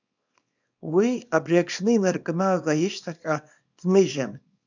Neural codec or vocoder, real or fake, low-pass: codec, 24 kHz, 0.9 kbps, WavTokenizer, small release; fake; 7.2 kHz